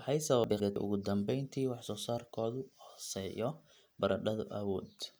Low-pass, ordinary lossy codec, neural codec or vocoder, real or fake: none; none; none; real